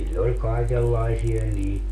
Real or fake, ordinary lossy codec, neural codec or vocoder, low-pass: real; AAC, 64 kbps; none; 14.4 kHz